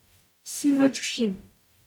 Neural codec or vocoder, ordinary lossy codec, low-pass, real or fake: codec, 44.1 kHz, 0.9 kbps, DAC; none; 19.8 kHz; fake